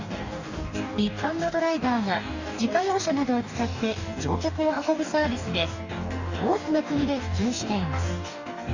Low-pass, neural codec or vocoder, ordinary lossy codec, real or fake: 7.2 kHz; codec, 44.1 kHz, 2.6 kbps, DAC; none; fake